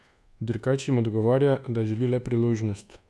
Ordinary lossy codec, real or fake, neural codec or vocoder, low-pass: none; fake; codec, 24 kHz, 1.2 kbps, DualCodec; none